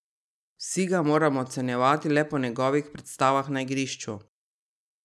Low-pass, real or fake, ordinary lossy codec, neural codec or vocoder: none; real; none; none